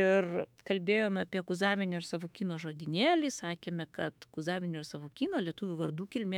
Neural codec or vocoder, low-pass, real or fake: autoencoder, 48 kHz, 32 numbers a frame, DAC-VAE, trained on Japanese speech; 19.8 kHz; fake